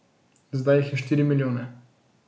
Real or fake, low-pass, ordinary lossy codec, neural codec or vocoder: real; none; none; none